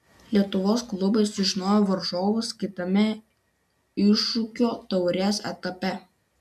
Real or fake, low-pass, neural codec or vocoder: real; 14.4 kHz; none